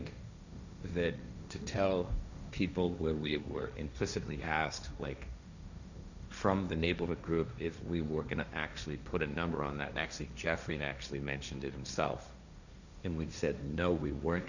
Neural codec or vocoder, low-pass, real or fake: codec, 16 kHz, 1.1 kbps, Voila-Tokenizer; 7.2 kHz; fake